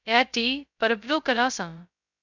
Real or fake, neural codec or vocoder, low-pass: fake; codec, 16 kHz, 0.2 kbps, FocalCodec; 7.2 kHz